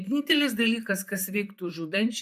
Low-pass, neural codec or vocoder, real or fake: 14.4 kHz; vocoder, 44.1 kHz, 128 mel bands, Pupu-Vocoder; fake